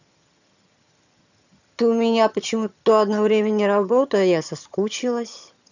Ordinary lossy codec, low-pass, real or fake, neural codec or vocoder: none; 7.2 kHz; fake; vocoder, 22.05 kHz, 80 mel bands, HiFi-GAN